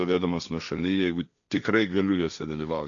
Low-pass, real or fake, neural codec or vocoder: 7.2 kHz; fake; codec, 16 kHz, 1.1 kbps, Voila-Tokenizer